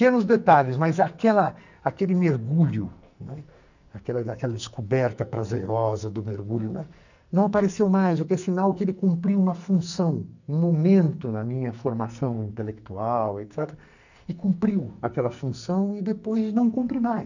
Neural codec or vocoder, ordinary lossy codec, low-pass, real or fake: codec, 44.1 kHz, 2.6 kbps, SNAC; none; 7.2 kHz; fake